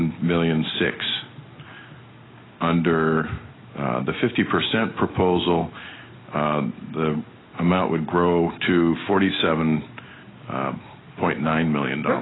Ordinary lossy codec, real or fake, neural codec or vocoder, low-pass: AAC, 16 kbps; real; none; 7.2 kHz